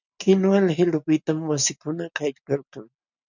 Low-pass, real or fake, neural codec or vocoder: 7.2 kHz; real; none